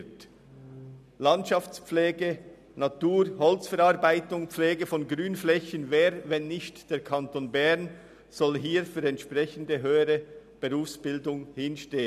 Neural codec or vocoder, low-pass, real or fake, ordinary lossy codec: none; 14.4 kHz; real; none